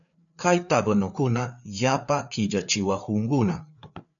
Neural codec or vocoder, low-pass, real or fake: codec, 16 kHz, 4 kbps, FreqCodec, larger model; 7.2 kHz; fake